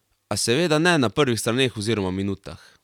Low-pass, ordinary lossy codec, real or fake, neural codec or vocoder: 19.8 kHz; none; real; none